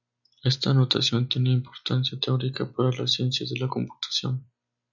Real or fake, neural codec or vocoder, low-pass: real; none; 7.2 kHz